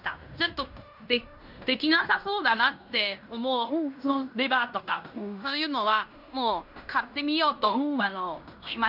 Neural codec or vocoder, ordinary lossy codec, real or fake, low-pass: codec, 16 kHz in and 24 kHz out, 0.9 kbps, LongCat-Audio-Codec, fine tuned four codebook decoder; none; fake; 5.4 kHz